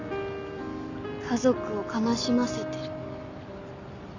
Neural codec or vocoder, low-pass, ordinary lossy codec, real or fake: none; 7.2 kHz; none; real